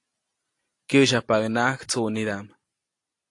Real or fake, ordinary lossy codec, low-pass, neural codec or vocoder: real; AAC, 48 kbps; 10.8 kHz; none